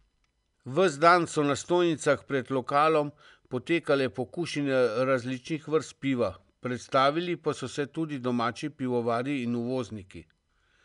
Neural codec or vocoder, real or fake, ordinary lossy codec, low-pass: none; real; none; 10.8 kHz